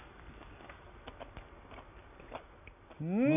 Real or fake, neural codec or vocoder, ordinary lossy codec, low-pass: real; none; none; 3.6 kHz